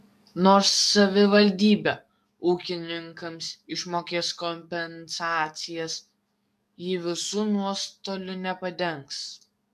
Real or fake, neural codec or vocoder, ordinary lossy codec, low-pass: fake; codec, 44.1 kHz, 7.8 kbps, DAC; MP3, 96 kbps; 14.4 kHz